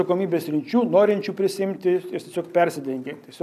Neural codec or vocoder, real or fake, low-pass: none; real; 14.4 kHz